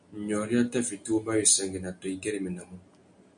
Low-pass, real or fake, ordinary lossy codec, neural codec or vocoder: 9.9 kHz; real; MP3, 64 kbps; none